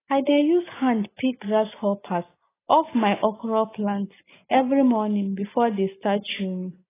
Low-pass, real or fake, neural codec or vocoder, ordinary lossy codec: 3.6 kHz; real; none; AAC, 16 kbps